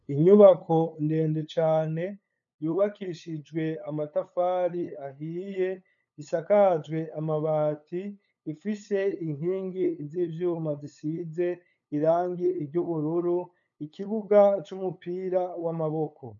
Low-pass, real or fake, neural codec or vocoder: 7.2 kHz; fake; codec, 16 kHz, 8 kbps, FunCodec, trained on LibriTTS, 25 frames a second